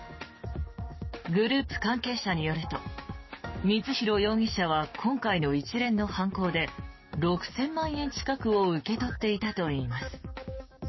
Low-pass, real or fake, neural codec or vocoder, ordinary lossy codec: 7.2 kHz; fake; codec, 16 kHz, 6 kbps, DAC; MP3, 24 kbps